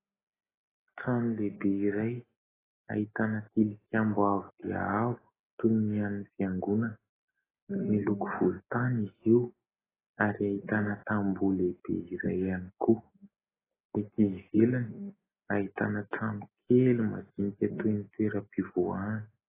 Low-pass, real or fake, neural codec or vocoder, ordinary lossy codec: 3.6 kHz; real; none; AAC, 16 kbps